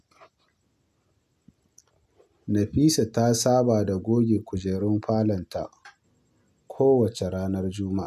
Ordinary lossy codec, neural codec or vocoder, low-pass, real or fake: none; none; 14.4 kHz; real